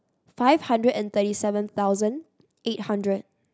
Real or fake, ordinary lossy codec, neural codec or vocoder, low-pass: real; none; none; none